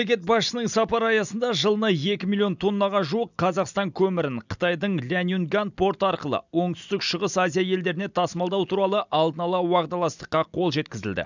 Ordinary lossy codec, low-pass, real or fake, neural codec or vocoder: none; 7.2 kHz; real; none